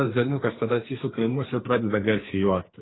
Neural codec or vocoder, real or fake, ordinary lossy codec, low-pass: codec, 44.1 kHz, 2.6 kbps, SNAC; fake; AAC, 16 kbps; 7.2 kHz